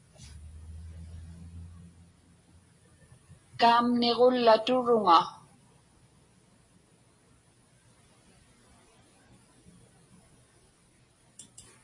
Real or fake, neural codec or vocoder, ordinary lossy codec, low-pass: real; none; AAC, 32 kbps; 10.8 kHz